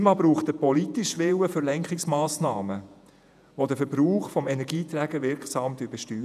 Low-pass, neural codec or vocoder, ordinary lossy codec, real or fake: 14.4 kHz; vocoder, 48 kHz, 128 mel bands, Vocos; none; fake